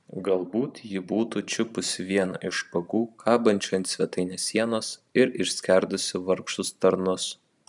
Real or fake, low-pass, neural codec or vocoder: fake; 10.8 kHz; vocoder, 44.1 kHz, 128 mel bands every 512 samples, BigVGAN v2